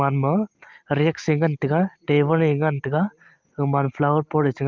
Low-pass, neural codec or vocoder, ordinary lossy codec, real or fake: 7.2 kHz; none; Opus, 24 kbps; real